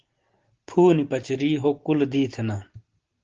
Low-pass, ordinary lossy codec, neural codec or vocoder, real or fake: 7.2 kHz; Opus, 16 kbps; none; real